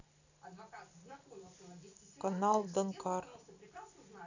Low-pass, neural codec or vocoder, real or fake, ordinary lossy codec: 7.2 kHz; none; real; none